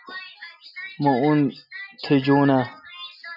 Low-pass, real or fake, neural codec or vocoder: 5.4 kHz; real; none